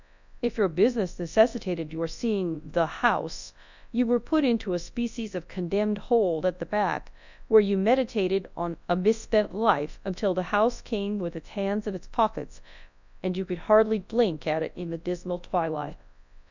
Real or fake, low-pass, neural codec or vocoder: fake; 7.2 kHz; codec, 24 kHz, 0.9 kbps, WavTokenizer, large speech release